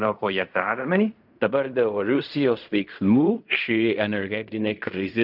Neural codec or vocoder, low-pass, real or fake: codec, 16 kHz in and 24 kHz out, 0.4 kbps, LongCat-Audio-Codec, fine tuned four codebook decoder; 5.4 kHz; fake